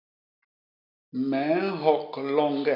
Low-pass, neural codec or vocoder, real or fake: 5.4 kHz; none; real